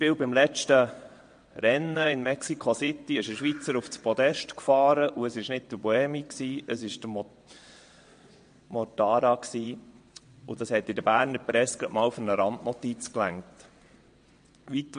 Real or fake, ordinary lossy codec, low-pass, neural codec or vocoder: fake; MP3, 48 kbps; 9.9 kHz; vocoder, 22.05 kHz, 80 mel bands, WaveNeXt